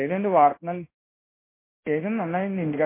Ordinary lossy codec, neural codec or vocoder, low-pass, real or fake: MP3, 24 kbps; codec, 16 kHz in and 24 kHz out, 1 kbps, XY-Tokenizer; 3.6 kHz; fake